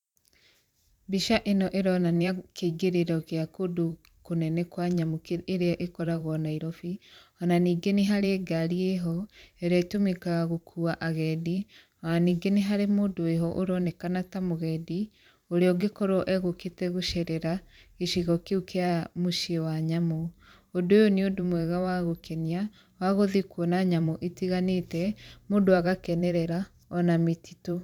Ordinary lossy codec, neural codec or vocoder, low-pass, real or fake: none; vocoder, 44.1 kHz, 128 mel bands every 512 samples, BigVGAN v2; 19.8 kHz; fake